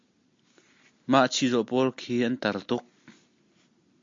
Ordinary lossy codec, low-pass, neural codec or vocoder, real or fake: MP3, 48 kbps; 7.2 kHz; none; real